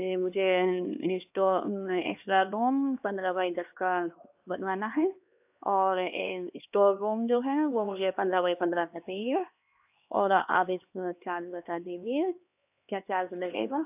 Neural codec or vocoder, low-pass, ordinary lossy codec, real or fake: codec, 16 kHz, 2 kbps, X-Codec, HuBERT features, trained on LibriSpeech; 3.6 kHz; AAC, 32 kbps; fake